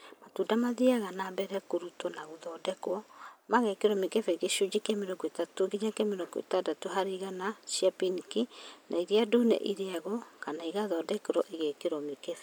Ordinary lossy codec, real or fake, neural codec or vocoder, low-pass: none; real; none; none